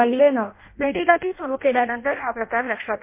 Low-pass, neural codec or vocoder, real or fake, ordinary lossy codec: 3.6 kHz; codec, 16 kHz in and 24 kHz out, 0.6 kbps, FireRedTTS-2 codec; fake; MP3, 24 kbps